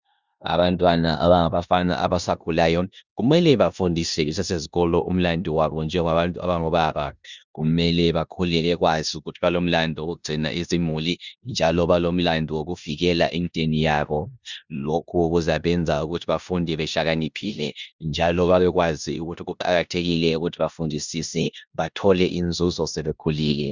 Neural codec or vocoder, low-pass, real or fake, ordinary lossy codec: codec, 16 kHz in and 24 kHz out, 0.9 kbps, LongCat-Audio-Codec, four codebook decoder; 7.2 kHz; fake; Opus, 64 kbps